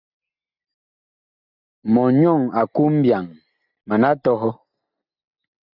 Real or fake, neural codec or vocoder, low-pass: real; none; 5.4 kHz